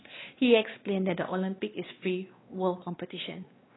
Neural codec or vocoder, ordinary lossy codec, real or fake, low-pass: codec, 16 kHz, 2 kbps, X-Codec, WavLM features, trained on Multilingual LibriSpeech; AAC, 16 kbps; fake; 7.2 kHz